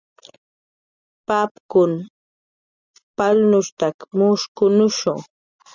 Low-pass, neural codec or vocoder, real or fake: 7.2 kHz; none; real